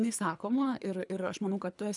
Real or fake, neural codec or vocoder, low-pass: fake; codec, 24 kHz, 3 kbps, HILCodec; 10.8 kHz